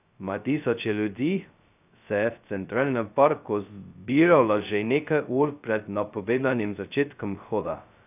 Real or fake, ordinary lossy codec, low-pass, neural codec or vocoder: fake; none; 3.6 kHz; codec, 16 kHz, 0.2 kbps, FocalCodec